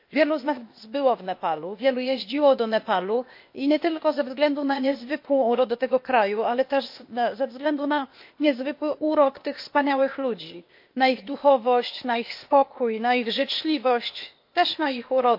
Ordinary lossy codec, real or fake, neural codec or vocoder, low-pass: MP3, 32 kbps; fake; codec, 16 kHz, 0.8 kbps, ZipCodec; 5.4 kHz